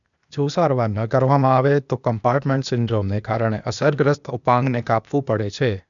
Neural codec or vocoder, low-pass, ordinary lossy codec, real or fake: codec, 16 kHz, 0.8 kbps, ZipCodec; 7.2 kHz; none; fake